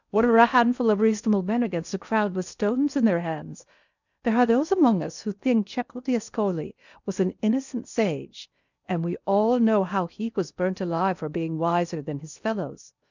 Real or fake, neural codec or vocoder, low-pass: fake; codec, 16 kHz in and 24 kHz out, 0.8 kbps, FocalCodec, streaming, 65536 codes; 7.2 kHz